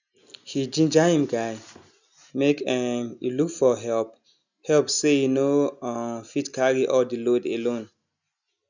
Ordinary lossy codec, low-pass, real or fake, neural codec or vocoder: none; 7.2 kHz; real; none